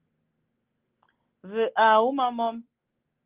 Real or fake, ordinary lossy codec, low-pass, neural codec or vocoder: real; Opus, 16 kbps; 3.6 kHz; none